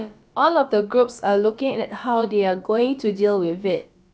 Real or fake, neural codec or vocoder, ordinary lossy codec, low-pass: fake; codec, 16 kHz, about 1 kbps, DyCAST, with the encoder's durations; none; none